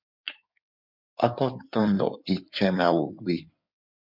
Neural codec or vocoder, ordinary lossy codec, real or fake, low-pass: codec, 16 kHz in and 24 kHz out, 2.2 kbps, FireRedTTS-2 codec; MP3, 48 kbps; fake; 5.4 kHz